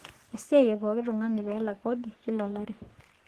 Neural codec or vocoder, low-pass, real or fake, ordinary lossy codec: codec, 44.1 kHz, 3.4 kbps, Pupu-Codec; 14.4 kHz; fake; Opus, 16 kbps